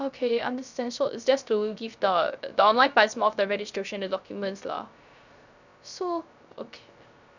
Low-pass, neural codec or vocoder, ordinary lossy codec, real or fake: 7.2 kHz; codec, 16 kHz, 0.3 kbps, FocalCodec; none; fake